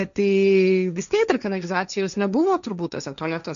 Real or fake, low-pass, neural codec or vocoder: fake; 7.2 kHz; codec, 16 kHz, 1.1 kbps, Voila-Tokenizer